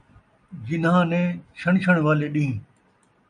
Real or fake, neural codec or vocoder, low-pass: real; none; 9.9 kHz